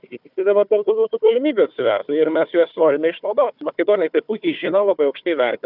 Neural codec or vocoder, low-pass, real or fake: codec, 16 kHz, 4 kbps, FunCodec, trained on Chinese and English, 50 frames a second; 5.4 kHz; fake